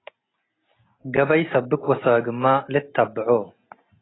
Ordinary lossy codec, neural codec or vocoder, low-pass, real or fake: AAC, 16 kbps; none; 7.2 kHz; real